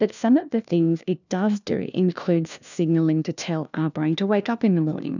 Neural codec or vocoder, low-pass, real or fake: codec, 16 kHz, 1 kbps, FunCodec, trained on LibriTTS, 50 frames a second; 7.2 kHz; fake